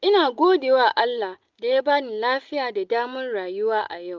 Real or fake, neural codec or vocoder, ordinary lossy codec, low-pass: real; none; Opus, 24 kbps; 7.2 kHz